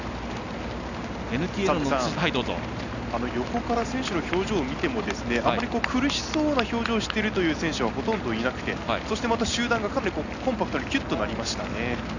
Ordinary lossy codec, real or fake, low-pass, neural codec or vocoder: none; real; 7.2 kHz; none